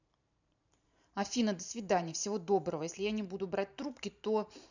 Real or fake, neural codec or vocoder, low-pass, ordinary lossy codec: fake; vocoder, 44.1 kHz, 128 mel bands every 512 samples, BigVGAN v2; 7.2 kHz; none